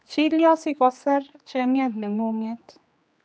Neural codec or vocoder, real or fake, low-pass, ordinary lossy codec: codec, 16 kHz, 2 kbps, X-Codec, HuBERT features, trained on general audio; fake; none; none